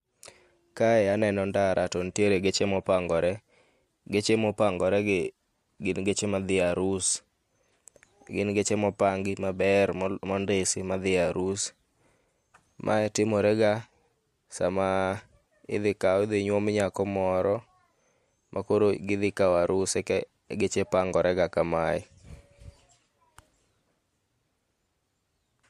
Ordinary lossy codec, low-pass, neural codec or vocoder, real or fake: MP3, 64 kbps; 14.4 kHz; none; real